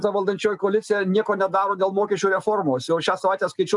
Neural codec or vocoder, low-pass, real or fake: none; 10.8 kHz; real